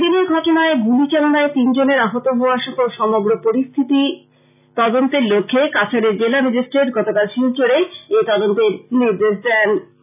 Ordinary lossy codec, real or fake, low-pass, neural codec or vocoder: none; real; 3.6 kHz; none